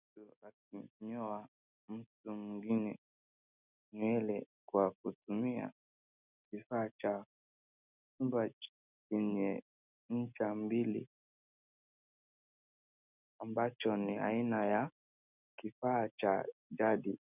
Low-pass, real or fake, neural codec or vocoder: 3.6 kHz; real; none